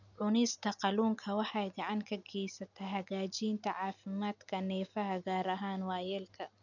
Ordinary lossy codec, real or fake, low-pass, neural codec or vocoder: none; real; 7.2 kHz; none